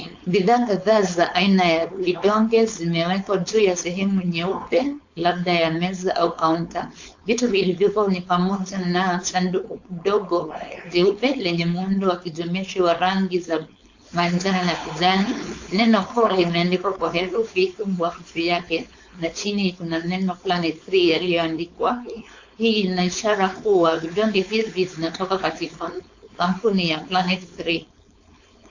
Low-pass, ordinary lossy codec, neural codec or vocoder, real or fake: 7.2 kHz; AAC, 48 kbps; codec, 16 kHz, 4.8 kbps, FACodec; fake